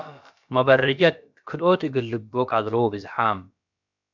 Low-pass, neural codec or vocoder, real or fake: 7.2 kHz; codec, 16 kHz, about 1 kbps, DyCAST, with the encoder's durations; fake